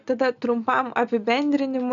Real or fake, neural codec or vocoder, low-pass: real; none; 7.2 kHz